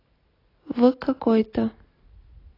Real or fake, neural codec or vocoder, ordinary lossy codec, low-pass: real; none; AAC, 24 kbps; 5.4 kHz